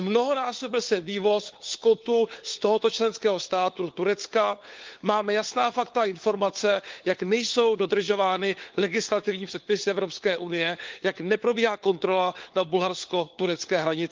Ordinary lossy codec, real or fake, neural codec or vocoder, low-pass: Opus, 32 kbps; fake; codec, 16 kHz, 4 kbps, FunCodec, trained on LibriTTS, 50 frames a second; 7.2 kHz